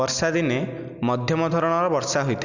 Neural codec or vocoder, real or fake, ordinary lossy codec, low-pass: none; real; none; 7.2 kHz